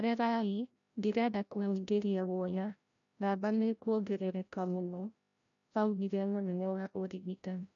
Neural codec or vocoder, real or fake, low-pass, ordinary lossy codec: codec, 16 kHz, 0.5 kbps, FreqCodec, larger model; fake; 7.2 kHz; none